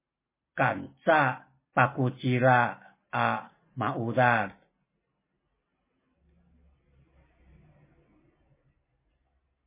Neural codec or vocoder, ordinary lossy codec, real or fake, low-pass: none; MP3, 16 kbps; real; 3.6 kHz